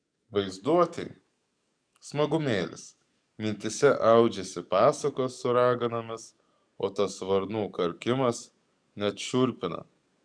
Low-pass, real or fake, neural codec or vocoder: 9.9 kHz; fake; codec, 44.1 kHz, 7.8 kbps, DAC